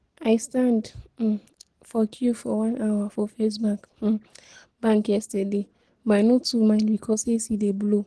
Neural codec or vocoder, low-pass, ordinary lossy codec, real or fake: none; 10.8 kHz; Opus, 16 kbps; real